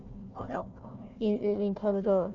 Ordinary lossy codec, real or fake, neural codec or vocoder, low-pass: none; fake; codec, 16 kHz, 1 kbps, FunCodec, trained on Chinese and English, 50 frames a second; 7.2 kHz